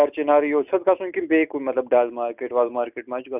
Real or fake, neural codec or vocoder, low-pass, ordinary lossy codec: real; none; 3.6 kHz; none